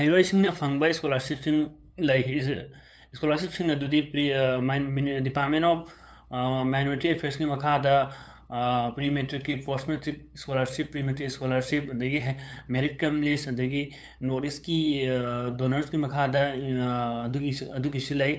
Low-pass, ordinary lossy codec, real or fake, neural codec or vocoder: none; none; fake; codec, 16 kHz, 8 kbps, FunCodec, trained on LibriTTS, 25 frames a second